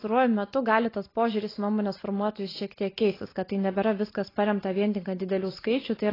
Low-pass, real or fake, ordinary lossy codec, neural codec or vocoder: 5.4 kHz; real; AAC, 24 kbps; none